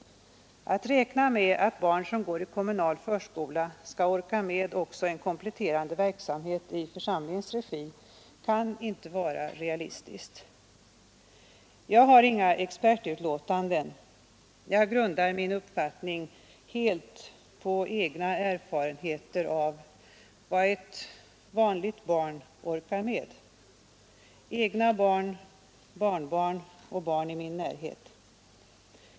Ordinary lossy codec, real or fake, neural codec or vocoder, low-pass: none; real; none; none